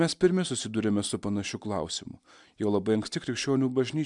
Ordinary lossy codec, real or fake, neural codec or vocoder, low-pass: MP3, 96 kbps; real; none; 10.8 kHz